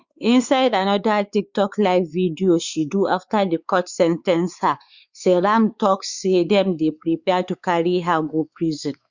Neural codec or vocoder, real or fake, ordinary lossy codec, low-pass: codec, 16 kHz, 4 kbps, X-Codec, WavLM features, trained on Multilingual LibriSpeech; fake; Opus, 64 kbps; 7.2 kHz